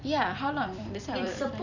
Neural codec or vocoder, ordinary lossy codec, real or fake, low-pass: vocoder, 44.1 kHz, 128 mel bands every 256 samples, BigVGAN v2; none; fake; 7.2 kHz